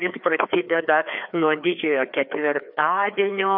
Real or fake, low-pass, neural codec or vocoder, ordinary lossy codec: fake; 5.4 kHz; codec, 16 kHz, 2 kbps, FreqCodec, larger model; MP3, 48 kbps